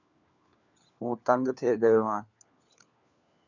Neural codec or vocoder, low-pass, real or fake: codec, 16 kHz, 4 kbps, FunCodec, trained on LibriTTS, 50 frames a second; 7.2 kHz; fake